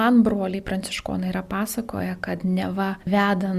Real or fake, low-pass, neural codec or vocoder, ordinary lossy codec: real; 14.4 kHz; none; Opus, 64 kbps